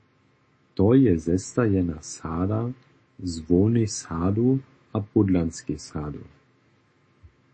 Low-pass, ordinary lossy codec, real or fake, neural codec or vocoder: 9.9 kHz; MP3, 32 kbps; fake; autoencoder, 48 kHz, 128 numbers a frame, DAC-VAE, trained on Japanese speech